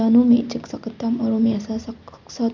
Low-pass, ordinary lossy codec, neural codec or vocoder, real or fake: 7.2 kHz; none; none; real